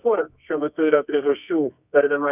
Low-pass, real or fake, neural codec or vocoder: 3.6 kHz; fake; codec, 24 kHz, 0.9 kbps, WavTokenizer, medium music audio release